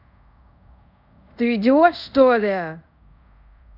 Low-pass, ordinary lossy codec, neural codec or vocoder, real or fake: 5.4 kHz; none; codec, 24 kHz, 0.5 kbps, DualCodec; fake